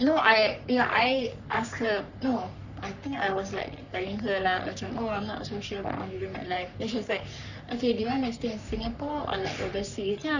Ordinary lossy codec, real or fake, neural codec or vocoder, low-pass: none; fake; codec, 44.1 kHz, 3.4 kbps, Pupu-Codec; 7.2 kHz